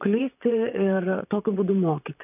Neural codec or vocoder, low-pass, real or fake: vocoder, 44.1 kHz, 128 mel bands, Pupu-Vocoder; 3.6 kHz; fake